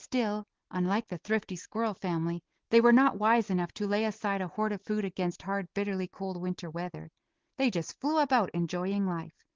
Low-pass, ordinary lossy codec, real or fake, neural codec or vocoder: 7.2 kHz; Opus, 16 kbps; real; none